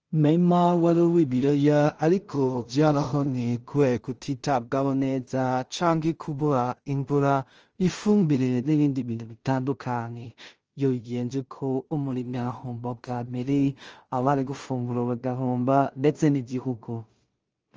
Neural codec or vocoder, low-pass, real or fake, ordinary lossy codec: codec, 16 kHz in and 24 kHz out, 0.4 kbps, LongCat-Audio-Codec, two codebook decoder; 7.2 kHz; fake; Opus, 24 kbps